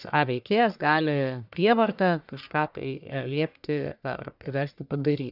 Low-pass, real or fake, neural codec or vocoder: 5.4 kHz; fake; codec, 44.1 kHz, 1.7 kbps, Pupu-Codec